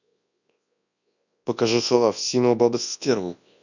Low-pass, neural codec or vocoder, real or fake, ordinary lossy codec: 7.2 kHz; codec, 24 kHz, 0.9 kbps, WavTokenizer, large speech release; fake; none